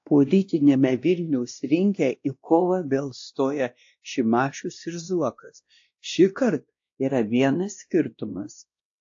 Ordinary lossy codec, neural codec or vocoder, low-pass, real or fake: AAC, 48 kbps; codec, 16 kHz, 1 kbps, X-Codec, WavLM features, trained on Multilingual LibriSpeech; 7.2 kHz; fake